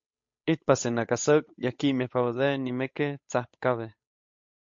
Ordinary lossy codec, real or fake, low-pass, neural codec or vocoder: MP3, 48 kbps; fake; 7.2 kHz; codec, 16 kHz, 8 kbps, FunCodec, trained on Chinese and English, 25 frames a second